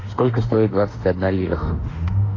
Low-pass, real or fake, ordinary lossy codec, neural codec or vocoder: 7.2 kHz; fake; AAC, 32 kbps; autoencoder, 48 kHz, 32 numbers a frame, DAC-VAE, trained on Japanese speech